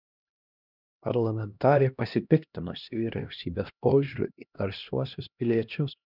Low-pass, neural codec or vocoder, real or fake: 5.4 kHz; codec, 16 kHz, 1 kbps, X-Codec, HuBERT features, trained on LibriSpeech; fake